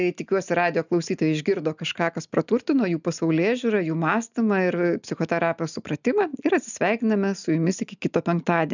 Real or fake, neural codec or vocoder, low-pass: real; none; 7.2 kHz